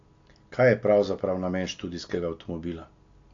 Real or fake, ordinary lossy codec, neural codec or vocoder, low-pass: real; AAC, 48 kbps; none; 7.2 kHz